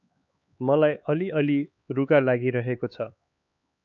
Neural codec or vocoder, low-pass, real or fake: codec, 16 kHz, 4 kbps, X-Codec, HuBERT features, trained on LibriSpeech; 7.2 kHz; fake